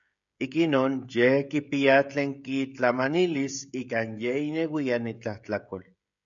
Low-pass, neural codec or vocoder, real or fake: 7.2 kHz; codec, 16 kHz, 16 kbps, FreqCodec, smaller model; fake